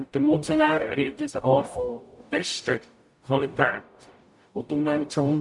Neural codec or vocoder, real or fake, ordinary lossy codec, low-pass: codec, 44.1 kHz, 0.9 kbps, DAC; fake; none; 10.8 kHz